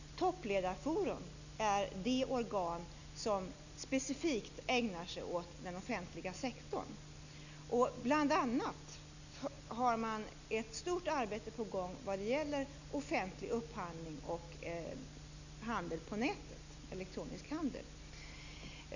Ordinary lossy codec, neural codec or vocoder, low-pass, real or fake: none; none; 7.2 kHz; real